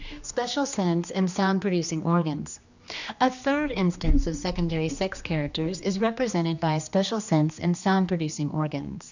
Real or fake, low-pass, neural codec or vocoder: fake; 7.2 kHz; codec, 16 kHz, 2 kbps, X-Codec, HuBERT features, trained on general audio